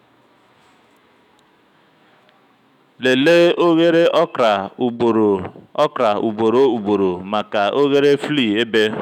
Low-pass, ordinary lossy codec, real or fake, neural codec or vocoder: 19.8 kHz; none; fake; autoencoder, 48 kHz, 128 numbers a frame, DAC-VAE, trained on Japanese speech